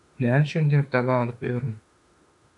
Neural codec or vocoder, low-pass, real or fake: autoencoder, 48 kHz, 32 numbers a frame, DAC-VAE, trained on Japanese speech; 10.8 kHz; fake